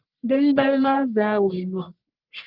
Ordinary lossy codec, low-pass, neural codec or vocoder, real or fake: Opus, 32 kbps; 5.4 kHz; codec, 44.1 kHz, 1.7 kbps, Pupu-Codec; fake